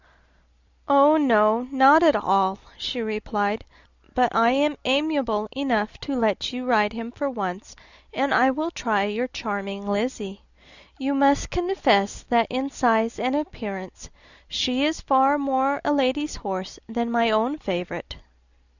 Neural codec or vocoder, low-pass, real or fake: none; 7.2 kHz; real